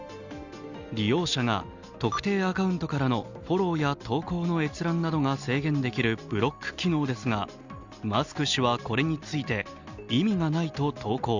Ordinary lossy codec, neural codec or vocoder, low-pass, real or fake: Opus, 64 kbps; none; 7.2 kHz; real